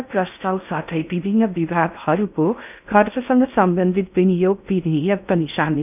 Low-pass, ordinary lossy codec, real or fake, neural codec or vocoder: 3.6 kHz; none; fake; codec, 16 kHz in and 24 kHz out, 0.6 kbps, FocalCodec, streaming, 4096 codes